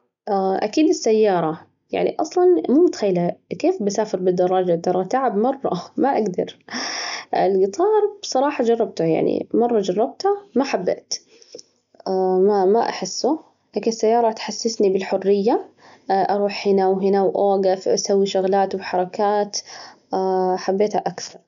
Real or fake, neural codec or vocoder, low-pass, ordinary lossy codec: real; none; 7.2 kHz; none